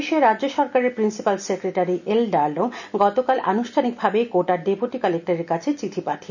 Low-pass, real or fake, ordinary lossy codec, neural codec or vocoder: 7.2 kHz; real; none; none